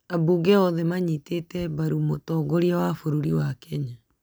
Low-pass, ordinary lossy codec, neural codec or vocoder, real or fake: none; none; none; real